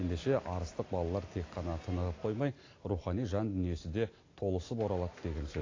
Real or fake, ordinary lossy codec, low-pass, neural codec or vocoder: real; AAC, 32 kbps; 7.2 kHz; none